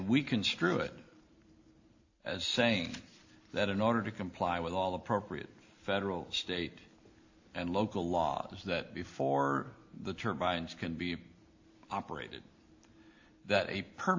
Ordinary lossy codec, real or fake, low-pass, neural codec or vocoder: AAC, 48 kbps; real; 7.2 kHz; none